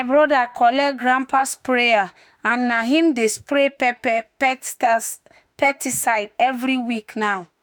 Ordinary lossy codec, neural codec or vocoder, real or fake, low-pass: none; autoencoder, 48 kHz, 32 numbers a frame, DAC-VAE, trained on Japanese speech; fake; none